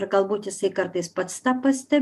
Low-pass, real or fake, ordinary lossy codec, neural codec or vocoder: 14.4 kHz; real; MP3, 96 kbps; none